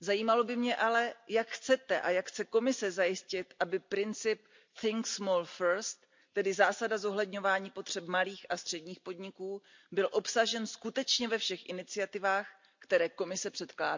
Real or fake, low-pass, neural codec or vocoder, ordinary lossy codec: real; 7.2 kHz; none; MP3, 64 kbps